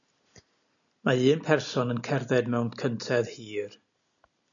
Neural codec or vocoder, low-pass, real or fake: none; 7.2 kHz; real